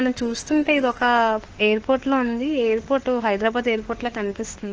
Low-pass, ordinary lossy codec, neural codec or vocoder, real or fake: 7.2 kHz; Opus, 16 kbps; autoencoder, 48 kHz, 32 numbers a frame, DAC-VAE, trained on Japanese speech; fake